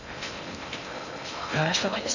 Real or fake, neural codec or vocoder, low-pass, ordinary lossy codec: fake; codec, 16 kHz in and 24 kHz out, 0.6 kbps, FocalCodec, streaming, 2048 codes; 7.2 kHz; none